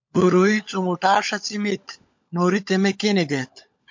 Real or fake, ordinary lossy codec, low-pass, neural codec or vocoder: fake; MP3, 48 kbps; 7.2 kHz; codec, 16 kHz, 16 kbps, FunCodec, trained on LibriTTS, 50 frames a second